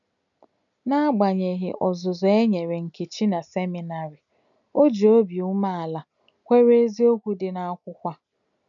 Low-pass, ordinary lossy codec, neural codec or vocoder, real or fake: 7.2 kHz; none; none; real